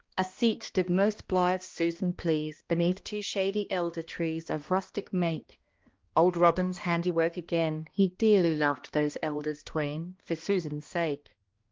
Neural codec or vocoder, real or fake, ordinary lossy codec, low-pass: codec, 16 kHz, 1 kbps, X-Codec, HuBERT features, trained on balanced general audio; fake; Opus, 16 kbps; 7.2 kHz